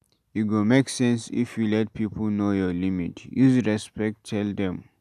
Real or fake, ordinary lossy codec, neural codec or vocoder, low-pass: real; none; none; 14.4 kHz